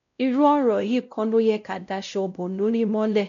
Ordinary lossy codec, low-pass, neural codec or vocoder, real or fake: none; 7.2 kHz; codec, 16 kHz, 0.5 kbps, X-Codec, WavLM features, trained on Multilingual LibriSpeech; fake